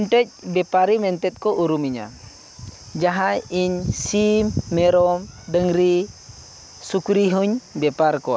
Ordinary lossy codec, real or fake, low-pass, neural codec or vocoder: none; real; none; none